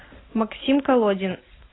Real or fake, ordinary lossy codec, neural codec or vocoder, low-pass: real; AAC, 16 kbps; none; 7.2 kHz